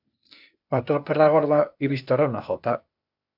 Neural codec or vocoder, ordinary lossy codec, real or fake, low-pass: codec, 16 kHz, 0.8 kbps, ZipCodec; AAC, 48 kbps; fake; 5.4 kHz